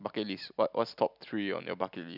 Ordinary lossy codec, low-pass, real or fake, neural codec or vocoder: none; 5.4 kHz; real; none